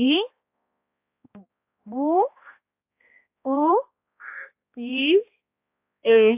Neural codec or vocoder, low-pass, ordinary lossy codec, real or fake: codec, 16 kHz, 1 kbps, X-Codec, HuBERT features, trained on balanced general audio; 3.6 kHz; AAC, 32 kbps; fake